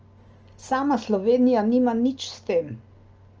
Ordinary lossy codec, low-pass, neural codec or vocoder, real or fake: Opus, 24 kbps; 7.2 kHz; none; real